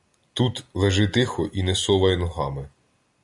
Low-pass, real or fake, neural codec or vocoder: 10.8 kHz; real; none